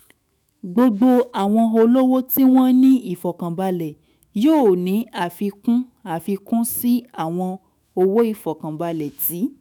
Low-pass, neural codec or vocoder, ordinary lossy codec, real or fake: none; autoencoder, 48 kHz, 128 numbers a frame, DAC-VAE, trained on Japanese speech; none; fake